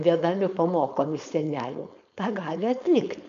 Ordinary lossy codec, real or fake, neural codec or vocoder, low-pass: MP3, 64 kbps; fake; codec, 16 kHz, 4.8 kbps, FACodec; 7.2 kHz